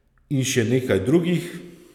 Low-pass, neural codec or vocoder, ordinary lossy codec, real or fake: 19.8 kHz; none; none; real